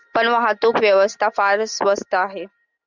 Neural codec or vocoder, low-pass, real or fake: none; 7.2 kHz; real